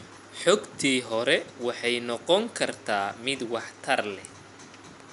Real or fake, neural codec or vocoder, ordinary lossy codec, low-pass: real; none; none; 10.8 kHz